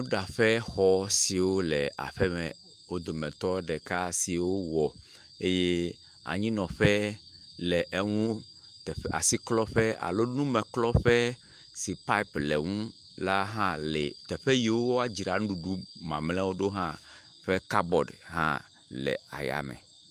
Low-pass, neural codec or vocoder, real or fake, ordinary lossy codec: 14.4 kHz; autoencoder, 48 kHz, 128 numbers a frame, DAC-VAE, trained on Japanese speech; fake; Opus, 32 kbps